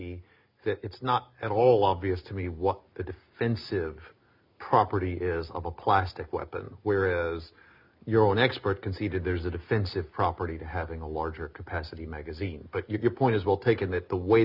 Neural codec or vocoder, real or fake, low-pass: none; real; 5.4 kHz